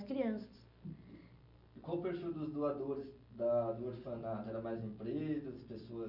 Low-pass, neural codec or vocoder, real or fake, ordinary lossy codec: 5.4 kHz; none; real; none